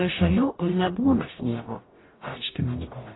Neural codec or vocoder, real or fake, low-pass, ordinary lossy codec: codec, 44.1 kHz, 0.9 kbps, DAC; fake; 7.2 kHz; AAC, 16 kbps